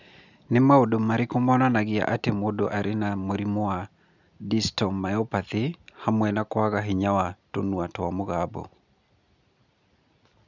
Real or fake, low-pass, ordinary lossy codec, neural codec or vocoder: real; 7.2 kHz; none; none